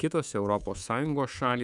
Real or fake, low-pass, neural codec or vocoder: fake; 10.8 kHz; codec, 24 kHz, 3.1 kbps, DualCodec